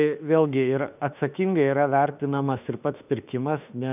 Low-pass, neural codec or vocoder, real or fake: 3.6 kHz; autoencoder, 48 kHz, 32 numbers a frame, DAC-VAE, trained on Japanese speech; fake